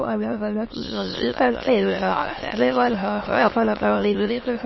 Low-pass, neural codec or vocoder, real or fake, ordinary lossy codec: 7.2 kHz; autoencoder, 22.05 kHz, a latent of 192 numbers a frame, VITS, trained on many speakers; fake; MP3, 24 kbps